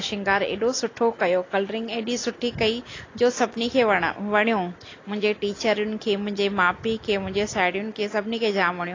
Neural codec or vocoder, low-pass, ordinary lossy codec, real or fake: none; 7.2 kHz; AAC, 32 kbps; real